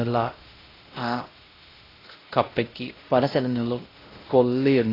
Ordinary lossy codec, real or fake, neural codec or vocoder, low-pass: AAC, 24 kbps; fake; codec, 16 kHz, 0.5 kbps, X-Codec, WavLM features, trained on Multilingual LibriSpeech; 5.4 kHz